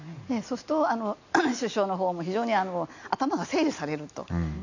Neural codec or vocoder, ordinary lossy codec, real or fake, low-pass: none; none; real; 7.2 kHz